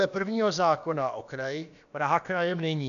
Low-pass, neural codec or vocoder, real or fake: 7.2 kHz; codec, 16 kHz, about 1 kbps, DyCAST, with the encoder's durations; fake